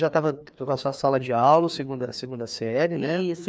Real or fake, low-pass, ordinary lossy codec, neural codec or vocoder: fake; none; none; codec, 16 kHz, 2 kbps, FreqCodec, larger model